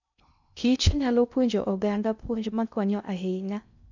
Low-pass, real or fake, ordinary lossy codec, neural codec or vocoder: 7.2 kHz; fake; none; codec, 16 kHz in and 24 kHz out, 0.6 kbps, FocalCodec, streaming, 2048 codes